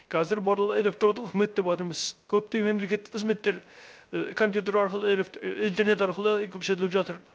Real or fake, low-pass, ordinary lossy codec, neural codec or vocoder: fake; none; none; codec, 16 kHz, 0.3 kbps, FocalCodec